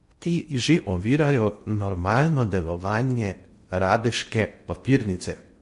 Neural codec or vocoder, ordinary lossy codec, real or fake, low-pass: codec, 16 kHz in and 24 kHz out, 0.6 kbps, FocalCodec, streaming, 2048 codes; MP3, 48 kbps; fake; 10.8 kHz